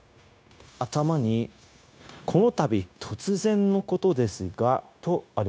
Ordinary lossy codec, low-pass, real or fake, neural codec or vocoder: none; none; fake; codec, 16 kHz, 0.9 kbps, LongCat-Audio-Codec